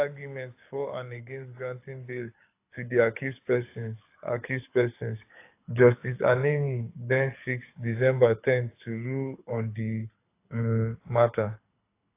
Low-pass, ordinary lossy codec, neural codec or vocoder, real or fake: 3.6 kHz; AAC, 24 kbps; codec, 24 kHz, 6 kbps, HILCodec; fake